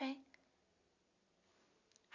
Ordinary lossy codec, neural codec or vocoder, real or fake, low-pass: none; none; real; 7.2 kHz